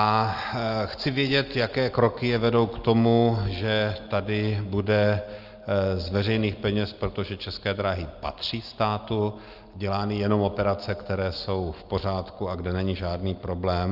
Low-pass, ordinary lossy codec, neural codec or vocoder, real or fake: 5.4 kHz; Opus, 24 kbps; none; real